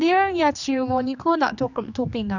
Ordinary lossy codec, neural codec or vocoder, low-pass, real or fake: none; codec, 16 kHz, 2 kbps, X-Codec, HuBERT features, trained on general audio; 7.2 kHz; fake